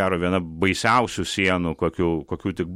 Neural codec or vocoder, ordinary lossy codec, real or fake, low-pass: none; MP3, 64 kbps; real; 19.8 kHz